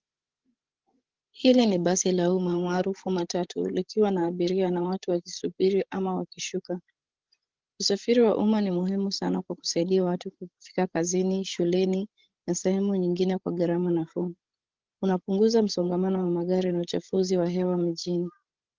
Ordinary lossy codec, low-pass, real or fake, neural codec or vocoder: Opus, 16 kbps; 7.2 kHz; fake; codec, 16 kHz, 16 kbps, FreqCodec, larger model